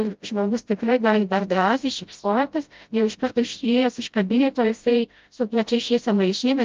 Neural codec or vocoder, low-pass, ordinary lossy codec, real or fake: codec, 16 kHz, 0.5 kbps, FreqCodec, smaller model; 7.2 kHz; Opus, 24 kbps; fake